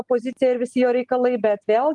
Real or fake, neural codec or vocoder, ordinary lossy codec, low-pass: real; none; Opus, 24 kbps; 10.8 kHz